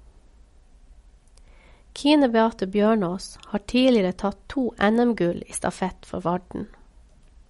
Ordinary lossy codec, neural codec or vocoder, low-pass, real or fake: MP3, 48 kbps; none; 19.8 kHz; real